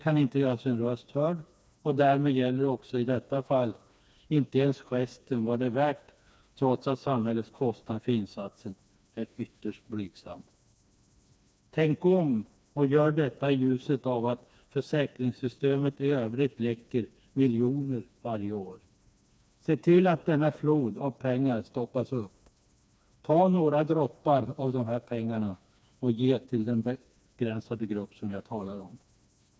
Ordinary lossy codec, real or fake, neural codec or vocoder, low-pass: none; fake; codec, 16 kHz, 2 kbps, FreqCodec, smaller model; none